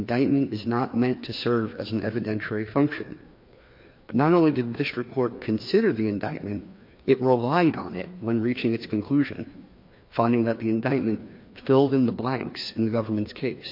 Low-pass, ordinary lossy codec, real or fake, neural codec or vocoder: 5.4 kHz; MP3, 32 kbps; fake; codec, 16 kHz, 2 kbps, FreqCodec, larger model